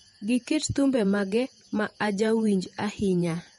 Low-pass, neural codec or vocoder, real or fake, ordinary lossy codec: 19.8 kHz; vocoder, 48 kHz, 128 mel bands, Vocos; fake; MP3, 48 kbps